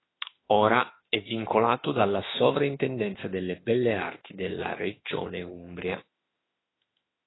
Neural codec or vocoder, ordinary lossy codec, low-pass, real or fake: autoencoder, 48 kHz, 32 numbers a frame, DAC-VAE, trained on Japanese speech; AAC, 16 kbps; 7.2 kHz; fake